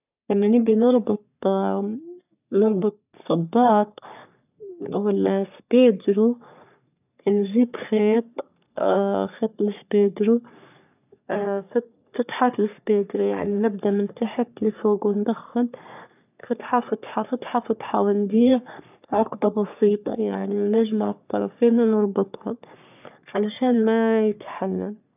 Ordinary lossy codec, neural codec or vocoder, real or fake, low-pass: none; codec, 44.1 kHz, 3.4 kbps, Pupu-Codec; fake; 3.6 kHz